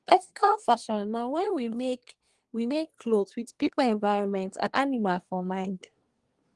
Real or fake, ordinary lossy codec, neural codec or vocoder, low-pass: fake; Opus, 24 kbps; codec, 24 kHz, 1 kbps, SNAC; 10.8 kHz